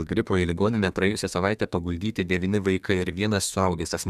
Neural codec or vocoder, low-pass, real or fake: codec, 32 kHz, 1.9 kbps, SNAC; 14.4 kHz; fake